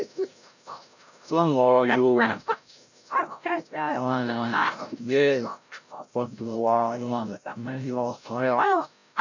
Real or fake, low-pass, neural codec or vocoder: fake; 7.2 kHz; codec, 16 kHz, 0.5 kbps, FreqCodec, larger model